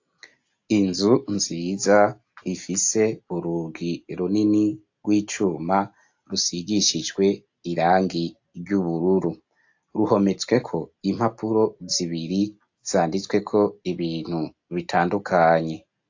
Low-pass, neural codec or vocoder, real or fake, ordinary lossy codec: 7.2 kHz; none; real; AAC, 48 kbps